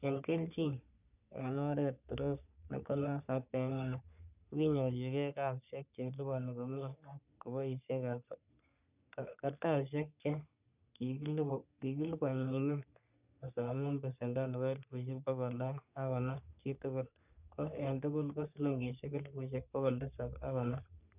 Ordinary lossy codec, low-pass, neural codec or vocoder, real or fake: none; 3.6 kHz; codec, 44.1 kHz, 3.4 kbps, Pupu-Codec; fake